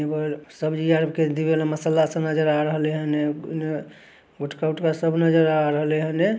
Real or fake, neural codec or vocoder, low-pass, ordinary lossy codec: real; none; none; none